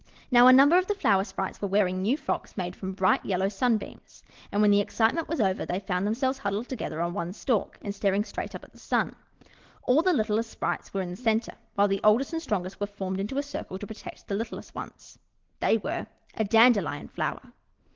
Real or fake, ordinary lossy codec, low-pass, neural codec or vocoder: real; Opus, 16 kbps; 7.2 kHz; none